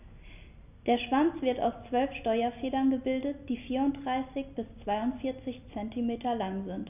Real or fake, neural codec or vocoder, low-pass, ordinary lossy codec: real; none; 3.6 kHz; none